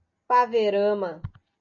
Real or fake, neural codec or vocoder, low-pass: real; none; 7.2 kHz